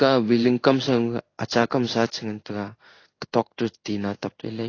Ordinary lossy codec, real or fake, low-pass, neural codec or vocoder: AAC, 32 kbps; fake; 7.2 kHz; codec, 16 kHz in and 24 kHz out, 1 kbps, XY-Tokenizer